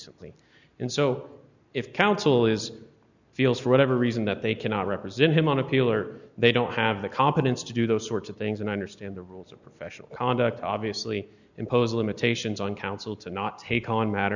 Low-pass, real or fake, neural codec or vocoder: 7.2 kHz; real; none